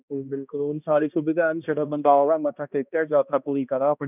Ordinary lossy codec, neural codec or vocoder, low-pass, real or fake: none; codec, 16 kHz, 1 kbps, X-Codec, HuBERT features, trained on balanced general audio; 3.6 kHz; fake